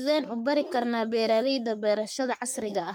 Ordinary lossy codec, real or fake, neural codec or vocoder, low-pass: none; fake; codec, 44.1 kHz, 3.4 kbps, Pupu-Codec; none